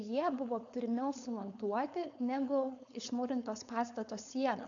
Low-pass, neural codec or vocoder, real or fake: 7.2 kHz; codec, 16 kHz, 4.8 kbps, FACodec; fake